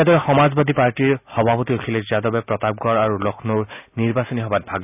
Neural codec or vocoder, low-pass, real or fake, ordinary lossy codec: none; 3.6 kHz; real; none